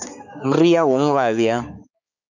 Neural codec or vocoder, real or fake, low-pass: codec, 16 kHz, 4 kbps, X-Codec, HuBERT features, trained on balanced general audio; fake; 7.2 kHz